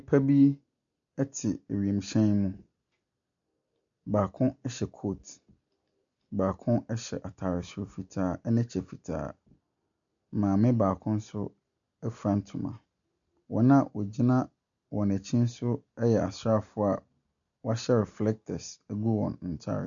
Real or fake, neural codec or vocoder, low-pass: real; none; 7.2 kHz